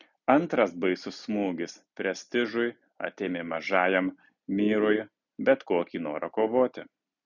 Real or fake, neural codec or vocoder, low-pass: real; none; 7.2 kHz